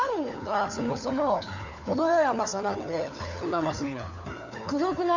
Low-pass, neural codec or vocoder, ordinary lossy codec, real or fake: 7.2 kHz; codec, 16 kHz, 4 kbps, FunCodec, trained on LibriTTS, 50 frames a second; none; fake